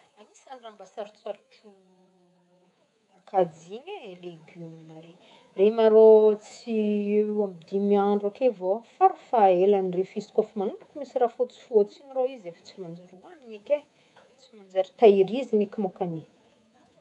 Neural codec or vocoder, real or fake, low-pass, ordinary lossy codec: codec, 24 kHz, 3.1 kbps, DualCodec; fake; 10.8 kHz; none